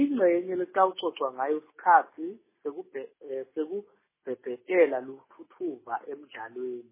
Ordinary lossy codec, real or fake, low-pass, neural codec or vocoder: MP3, 16 kbps; real; 3.6 kHz; none